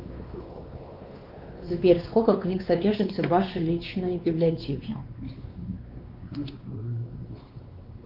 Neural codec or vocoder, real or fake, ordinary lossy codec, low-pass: codec, 16 kHz, 2 kbps, X-Codec, WavLM features, trained on Multilingual LibriSpeech; fake; Opus, 24 kbps; 5.4 kHz